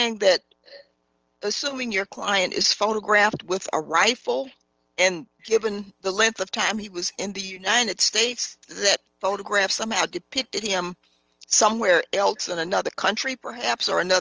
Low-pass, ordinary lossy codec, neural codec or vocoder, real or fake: 7.2 kHz; Opus, 24 kbps; none; real